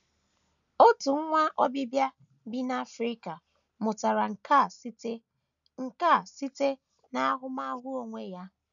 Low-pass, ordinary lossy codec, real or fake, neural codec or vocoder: 7.2 kHz; none; real; none